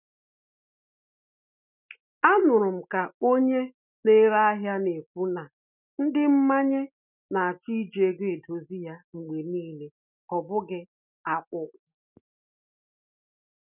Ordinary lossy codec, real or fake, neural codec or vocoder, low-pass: none; real; none; 3.6 kHz